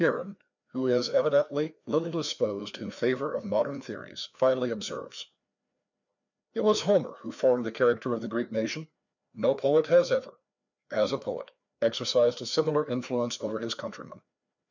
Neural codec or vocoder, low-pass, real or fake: codec, 16 kHz, 2 kbps, FreqCodec, larger model; 7.2 kHz; fake